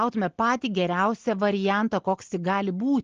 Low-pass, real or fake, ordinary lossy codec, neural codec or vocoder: 7.2 kHz; real; Opus, 16 kbps; none